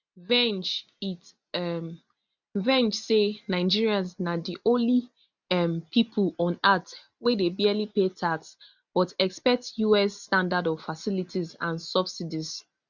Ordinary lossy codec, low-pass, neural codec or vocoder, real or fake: none; 7.2 kHz; none; real